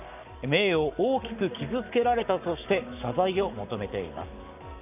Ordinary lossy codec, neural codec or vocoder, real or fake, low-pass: none; codec, 44.1 kHz, 7.8 kbps, Pupu-Codec; fake; 3.6 kHz